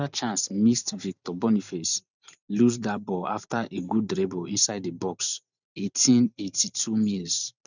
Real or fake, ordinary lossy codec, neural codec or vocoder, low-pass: fake; none; vocoder, 44.1 kHz, 128 mel bands every 512 samples, BigVGAN v2; 7.2 kHz